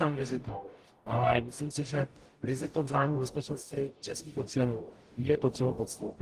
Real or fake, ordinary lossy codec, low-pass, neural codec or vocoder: fake; Opus, 24 kbps; 14.4 kHz; codec, 44.1 kHz, 0.9 kbps, DAC